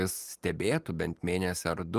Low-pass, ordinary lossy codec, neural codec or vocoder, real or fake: 14.4 kHz; Opus, 32 kbps; none; real